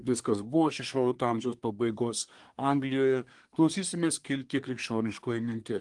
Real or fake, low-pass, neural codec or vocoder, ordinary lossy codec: fake; 10.8 kHz; codec, 24 kHz, 1 kbps, SNAC; Opus, 24 kbps